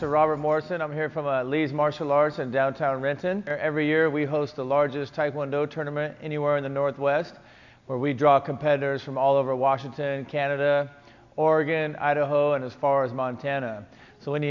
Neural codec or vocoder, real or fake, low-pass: none; real; 7.2 kHz